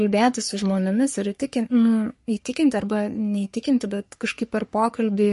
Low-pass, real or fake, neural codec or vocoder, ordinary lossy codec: 14.4 kHz; fake; codec, 44.1 kHz, 3.4 kbps, Pupu-Codec; MP3, 48 kbps